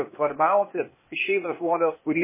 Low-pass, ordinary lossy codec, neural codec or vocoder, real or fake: 3.6 kHz; MP3, 16 kbps; codec, 16 kHz, 0.8 kbps, ZipCodec; fake